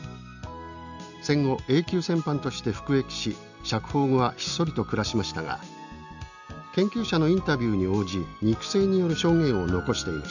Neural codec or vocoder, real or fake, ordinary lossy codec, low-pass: none; real; none; 7.2 kHz